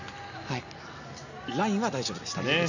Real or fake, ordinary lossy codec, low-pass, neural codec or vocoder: real; none; 7.2 kHz; none